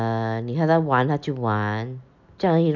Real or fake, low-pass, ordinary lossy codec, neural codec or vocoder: real; 7.2 kHz; none; none